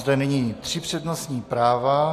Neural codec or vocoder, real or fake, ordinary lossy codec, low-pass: none; real; AAC, 64 kbps; 14.4 kHz